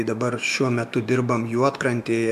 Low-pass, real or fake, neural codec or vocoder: 14.4 kHz; fake; autoencoder, 48 kHz, 128 numbers a frame, DAC-VAE, trained on Japanese speech